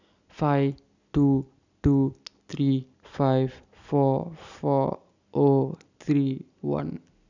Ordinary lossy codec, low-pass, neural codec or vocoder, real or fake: none; 7.2 kHz; none; real